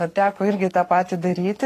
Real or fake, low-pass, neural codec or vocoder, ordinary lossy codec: fake; 14.4 kHz; codec, 44.1 kHz, 7.8 kbps, Pupu-Codec; AAC, 48 kbps